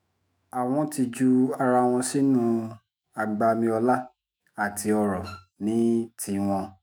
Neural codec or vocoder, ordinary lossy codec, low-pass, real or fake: autoencoder, 48 kHz, 128 numbers a frame, DAC-VAE, trained on Japanese speech; none; none; fake